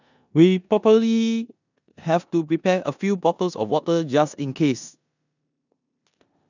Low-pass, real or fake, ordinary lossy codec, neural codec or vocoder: 7.2 kHz; fake; none; codec, 16 kHz in and 24 kHz out, 0.9 kbps, LongCat-Audio-Codec, four codebook decoder